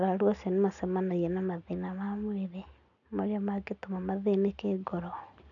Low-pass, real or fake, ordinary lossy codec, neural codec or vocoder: 7.2 kHz; real; none; none